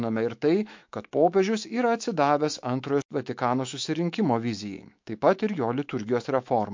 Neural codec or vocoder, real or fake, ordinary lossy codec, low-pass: autoencoder, 48 kHz, 128 numbers a frame, DAC-VAE, trained on Japanese speech; fake; MP3, 48 kbps; 7.2 kHz